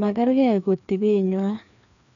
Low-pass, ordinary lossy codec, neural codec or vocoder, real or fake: 7.2 kHz; none; codec, 16 kHz, 2 kbps, FreqCodec, larger model; fake